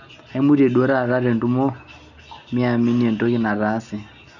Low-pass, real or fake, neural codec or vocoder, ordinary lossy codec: 7.2 kHz; real; none; none